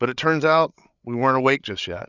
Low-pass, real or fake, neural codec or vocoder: 7.2 kHz; fake; codec, 16 kHz, 8 kbps, FreqCodec, larger model